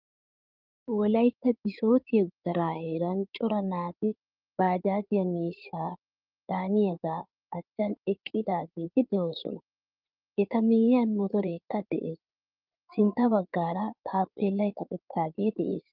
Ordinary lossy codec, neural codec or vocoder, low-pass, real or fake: Opus, 24 kbps; codec, 16 kHz in and 24 kHz out, 2.2 kbps, FireRedTTS-2 codec; 5.4 kHz; fake